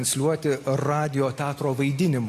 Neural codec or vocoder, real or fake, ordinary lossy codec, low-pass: none; real; AAC, 48 kbps; 14.4 kHz